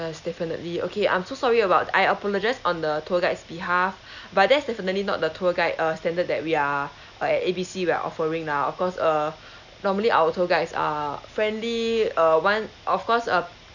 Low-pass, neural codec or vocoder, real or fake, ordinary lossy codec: 7.2 kHz; none; real; none